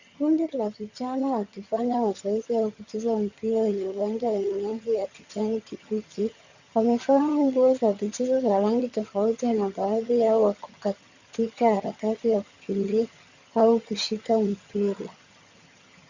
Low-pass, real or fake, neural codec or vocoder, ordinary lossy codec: 7.2 kHz; fake; vocoder, 22.05 kHz, 80 mel bands, HiFi-GAN; Opus, 64 kbps